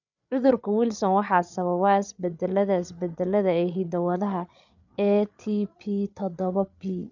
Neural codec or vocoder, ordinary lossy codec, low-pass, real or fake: codec, 16 kHz, 8 kbps, FreqCodec, larger model; none; 7.2 kHz; fake